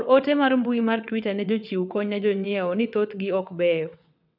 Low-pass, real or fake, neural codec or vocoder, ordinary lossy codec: 5.4 kHz; fake; vocoder, 22.05 kHz, 80 mel bands, WaveNeXt; none